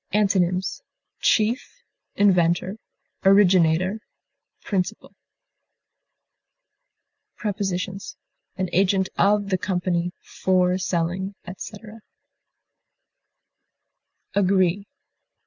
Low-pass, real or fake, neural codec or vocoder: 7.2 kHz; real; none